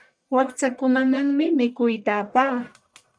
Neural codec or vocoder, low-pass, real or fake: codec, 44.1 kHz, 1.7 kbps, Pupu-Codec; 9.9 kHz; fake